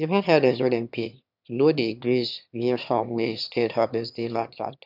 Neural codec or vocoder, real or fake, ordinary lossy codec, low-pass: autoencoder, 22.05 kHz, a latent of 192 numbers a frame, VITS, trained on one speaker; fake; none; 5.4 kHz